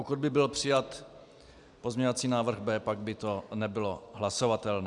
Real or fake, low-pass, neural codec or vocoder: real; 10.8 kHz; none